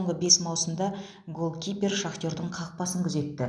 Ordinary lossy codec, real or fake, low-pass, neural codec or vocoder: none; real; none; none